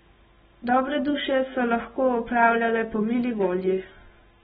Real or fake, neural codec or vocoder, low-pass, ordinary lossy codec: real; none; 19.8 kHz; AAC, 16 kbps